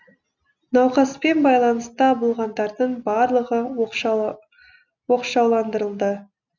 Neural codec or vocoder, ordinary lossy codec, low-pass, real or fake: none; none; 7.2 kHz; real